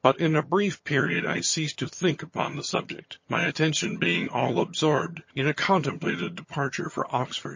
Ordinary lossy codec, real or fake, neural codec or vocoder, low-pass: MP3, 32 kbps; fake; vocoder, 22.05 kHz, 80 mel bands, HiFi-GAN; 7.2 kHz